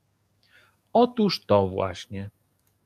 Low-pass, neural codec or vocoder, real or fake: 14.4 kHz; codec, 44.1 kHz, 7.8 kbps, DAC; fake